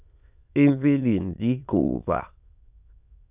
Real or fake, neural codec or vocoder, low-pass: fake; autoencoder, 22.05 kHz, a latent of 192 numbers a frame, VITS, trained on many speakers; 3.6 kHz